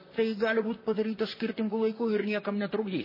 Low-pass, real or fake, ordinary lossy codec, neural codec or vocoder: 5.4 kHz; fake; MP3, 24 kbps; vocoder, 44.1 kHz, 128 mel bands, Pupu-Vocoder